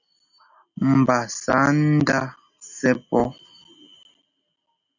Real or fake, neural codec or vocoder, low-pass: real; none; 7.2 kHz